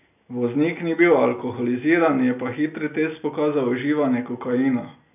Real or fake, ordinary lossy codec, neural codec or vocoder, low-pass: real; none; none; 3.6 kHz